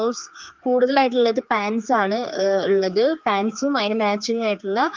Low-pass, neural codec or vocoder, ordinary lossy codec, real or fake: 7.2 kHz; codec, 16 kHz, 4 kbps, X-Codec, HuBERT features, trained on general audio; Opus, 32 kbps; fake